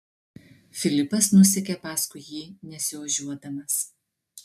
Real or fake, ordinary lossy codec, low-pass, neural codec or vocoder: real; MP3, 96 kbps; 14.4 kHz; none